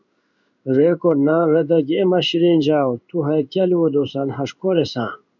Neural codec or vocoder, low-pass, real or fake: codec, 16 kHz in and 24 kHz out, 1 kbps, XY-Tokenizer; 7.2 kHz; fake